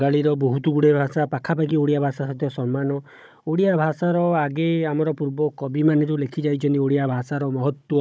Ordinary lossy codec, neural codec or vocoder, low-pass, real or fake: none; codec, 16 kHz, 16 kbps, FunCodec, trained on Chinese and English, 50 frames a second; none; fake